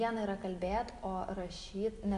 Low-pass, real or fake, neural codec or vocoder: 10.8 kHz; real; none